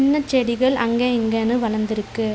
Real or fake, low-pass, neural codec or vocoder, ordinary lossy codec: real; none; none; none